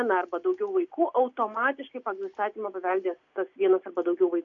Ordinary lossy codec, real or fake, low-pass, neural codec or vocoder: AAC, 48 kbps; real; 7.2 kHz; none